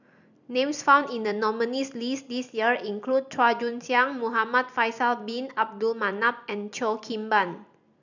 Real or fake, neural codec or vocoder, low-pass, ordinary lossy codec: real; none; 7.2 kHz; none